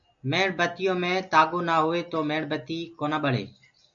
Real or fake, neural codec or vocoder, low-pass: real; none; 7.2 kHz